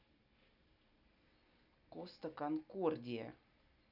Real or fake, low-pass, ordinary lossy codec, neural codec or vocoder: real; 5.4 kHz; none; none